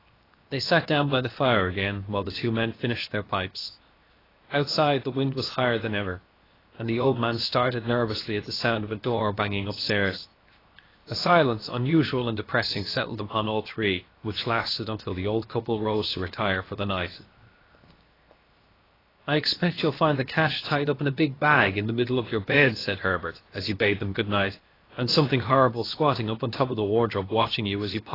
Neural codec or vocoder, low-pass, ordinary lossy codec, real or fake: codec, 16 kHz, 0.7 kbps, FocalCodec; 5.4 kHz; AAC, 24 kbps; fake